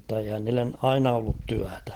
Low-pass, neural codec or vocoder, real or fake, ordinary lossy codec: 19.8 kHz; none; real; Opus, 16 kbps